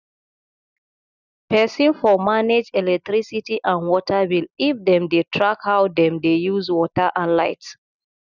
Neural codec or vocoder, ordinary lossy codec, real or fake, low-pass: none; none; real; 7.2 kHz